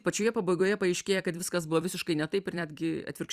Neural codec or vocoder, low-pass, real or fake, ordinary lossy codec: vocoder, 44.1 kHz, 128 mel bands every 256 samples, BigVGAN v2; 14.4 kHz; fake; Opus, 64 kbps